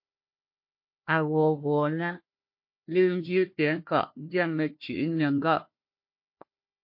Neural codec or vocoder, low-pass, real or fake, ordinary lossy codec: codec, 16 kHz, 1 kbps, FunCodec, trained on Chinese and English, 50 frames a second; 5.4 kHz; fake; MP3, 32 kbps